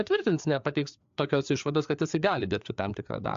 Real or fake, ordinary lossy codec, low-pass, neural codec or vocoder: fake; AAC, 64 kbps; 7.2 kHz; codec, 16 kHz, 4 kbps, FreqCodec, larger model